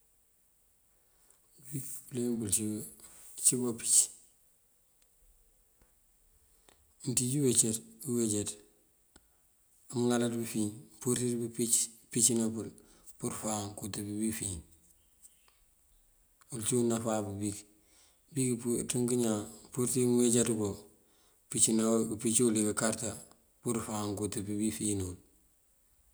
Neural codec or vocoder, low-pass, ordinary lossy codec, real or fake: none; none; none; real